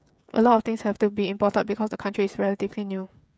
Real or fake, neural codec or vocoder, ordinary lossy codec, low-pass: fake; codec, 16 kHz, 16 kbps, FreqCodec, smaller model; none; none